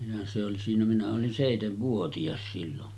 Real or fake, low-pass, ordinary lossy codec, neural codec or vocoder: real; none; none; none